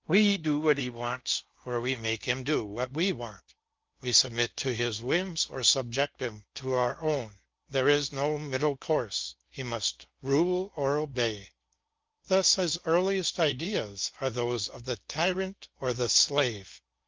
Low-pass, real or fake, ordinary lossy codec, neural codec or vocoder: 7.2 kHz; fake; Opus, 24 kbps; codec, 16 kHz in and 24 kHz out, 0.6 kbps, FocalCodec, streaming, 4096 codes